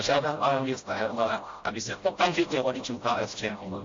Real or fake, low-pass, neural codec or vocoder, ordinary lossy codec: fake; 7.2 kHz; codec, 16 kHz, 0.5 kbps, FreqCodec, smaller model; AAC, 48 kbps